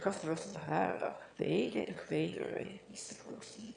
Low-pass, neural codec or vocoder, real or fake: 9.9 kHz; autoencoder, 22.05 kHz, a latent of 192 numbers a frame, VITS, trained on one speaker; fake